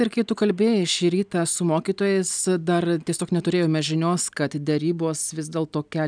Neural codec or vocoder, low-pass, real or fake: none; 9.9 kHz; real